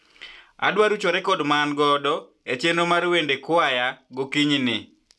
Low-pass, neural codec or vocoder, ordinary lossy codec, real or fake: none; none; none; real